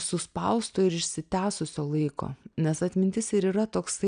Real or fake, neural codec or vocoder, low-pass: real; none; 9.9 kHz